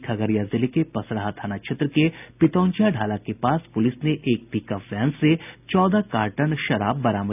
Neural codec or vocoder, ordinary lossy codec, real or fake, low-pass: none; none; real; 3.6 kHz